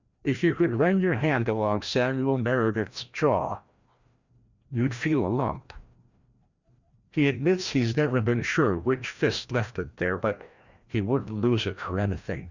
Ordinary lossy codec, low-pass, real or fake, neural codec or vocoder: Opus, 64 kbps; 7.2 kHz; fake; codec, 16 kHz, 1 kbps, FreqCodec, larger model